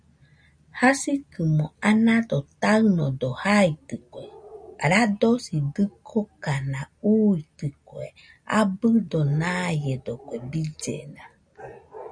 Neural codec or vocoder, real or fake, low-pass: none; real; 9.9 kHz